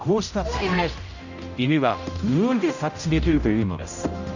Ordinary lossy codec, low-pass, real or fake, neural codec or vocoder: none; 7.2 kHz; fake; codec, 16 kHz, 0.5 kbps, X-Codec, HuBERT features, trained on general audio